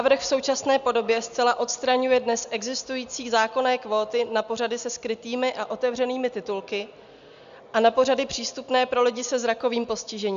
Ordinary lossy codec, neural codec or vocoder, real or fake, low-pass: AAC, 96 kbps; none; real; 7.2 kHz